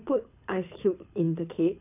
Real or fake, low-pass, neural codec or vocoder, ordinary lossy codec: fake; 3.6 kHz; codec, 24 kHz, 6 kbps, HILCodec; none